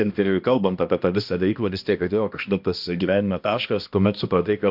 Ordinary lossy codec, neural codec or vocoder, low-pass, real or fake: MP3, 48 kbps; codec, 16 kHz, 1 kbps, X-Codec, HuBERT features, trained on balanced general audio; 5.4 kHz; fake